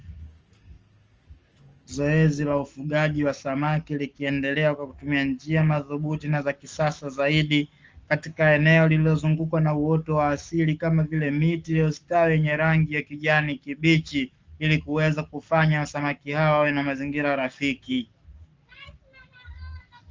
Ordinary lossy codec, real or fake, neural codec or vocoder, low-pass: Opus, 24 kbps; fake; codec, 44.1 kHz, 7.8 kbps, Pupu-Codec; 7.2 kHz